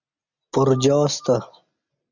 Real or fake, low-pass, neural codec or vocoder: real; 7.2 kHz; none